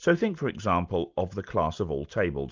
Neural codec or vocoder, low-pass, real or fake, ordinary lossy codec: none; 7.2 kHz; real; Opus, 24 kbps